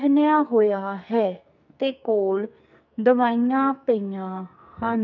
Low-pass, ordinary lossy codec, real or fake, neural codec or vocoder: 7.2 kHz; none; fake; codec, 44.1 kHz, 2.6 kbps, SNAC